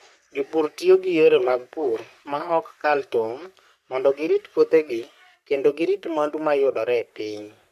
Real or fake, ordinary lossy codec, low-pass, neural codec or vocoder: fake; AAC, 96 kbps; 14.4 kHz; codec, 44.1 kHz, 3.4 kbps, Pupu-Codec